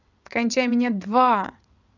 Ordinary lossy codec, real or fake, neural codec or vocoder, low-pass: none; fake; vocoder, 22.05 kHz, 80 mel bands, WaveNeXt; 7.2 kHz